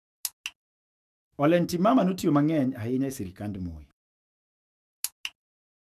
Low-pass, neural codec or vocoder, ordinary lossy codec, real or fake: 14.4 kHz; autoencoder, 48 kHz, 128 numbers a frame, DAC-VAE, trained on Japanese speech; none; fake